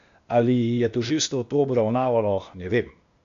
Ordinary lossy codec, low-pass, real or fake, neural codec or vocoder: AAC, 96 kbps; 7.2 kHz; fake; codec, 16 kHz, 0.8 kbps, ZipCodec